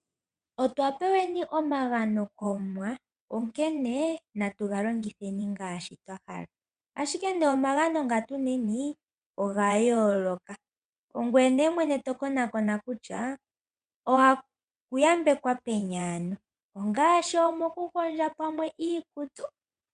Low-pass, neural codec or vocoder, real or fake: 9.9 kHz; vocoder, 22.05 kHz, 80 mel bands, WaveNeXt; fake